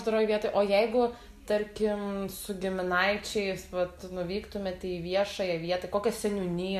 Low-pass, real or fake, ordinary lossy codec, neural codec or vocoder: 14.4 kHz; real; MP3, 64 kbps; none